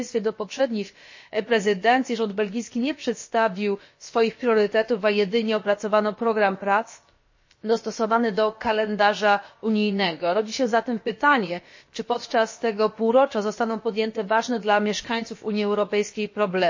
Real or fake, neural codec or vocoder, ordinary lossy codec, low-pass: fake; codec, 16 kHz, about 1 kbps, DyCAST, with the encoder's durations; MP3, 32 kbps; 7.2 kHz